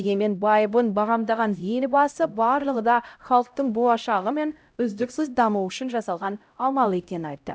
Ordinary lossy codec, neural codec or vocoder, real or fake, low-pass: none; codec, 16 kHz, 0.5 kbps, X-Codec, HuBERT features, trained on LibriSpeech; fake; none